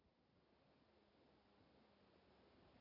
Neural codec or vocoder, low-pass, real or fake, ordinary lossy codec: none; 5.4 kHz; real; none